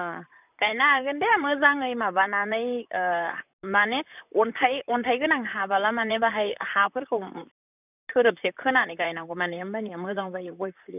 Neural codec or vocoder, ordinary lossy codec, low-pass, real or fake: vocoder, 44.1 kHz, 128 mel bands, Pupu-Vocoder; none; 3.6 kHz; fake